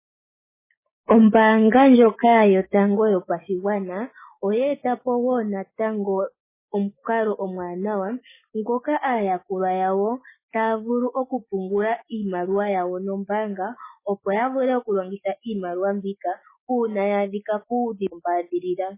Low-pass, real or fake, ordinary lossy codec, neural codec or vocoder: 3.6 kHz; real; MP3, 16 kbps; none